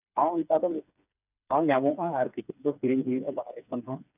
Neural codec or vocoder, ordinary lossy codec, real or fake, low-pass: codec, 16 kHz, 4 kbps, FreqCodec, smaller model; none; fake; 3.6 kHz